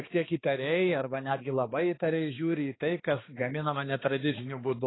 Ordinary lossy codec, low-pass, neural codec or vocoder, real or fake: AAC, 16 kbps; 7.2 kHz; codec, 16 kHz, 2 kbps, X-Codec, HuBERT features, trained on balanced general audio; fake